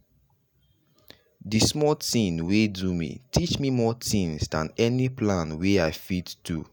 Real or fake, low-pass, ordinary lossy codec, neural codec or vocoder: real; none; none; none